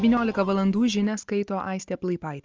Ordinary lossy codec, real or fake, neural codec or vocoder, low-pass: Opus, 24 kbps; real; none; 7.2 kHz